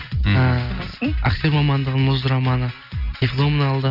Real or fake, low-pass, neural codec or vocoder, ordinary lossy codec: real; 5.4 kHz; none; none